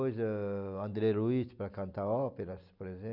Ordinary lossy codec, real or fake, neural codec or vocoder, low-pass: none; real; none; 5.4 kHz